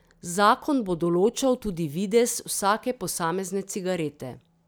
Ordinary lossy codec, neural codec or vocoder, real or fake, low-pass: none; none; real; none